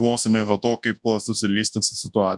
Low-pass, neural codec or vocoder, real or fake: 10.8 kHz; codec, 24 kHz, 0.9 kbps, WavTokenizer, large speech release; fake